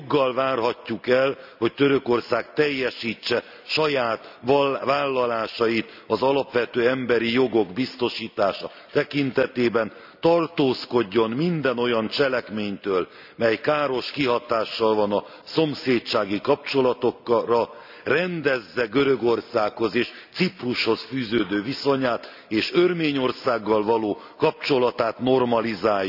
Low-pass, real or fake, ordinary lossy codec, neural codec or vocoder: 5.4 kHz; real; none; none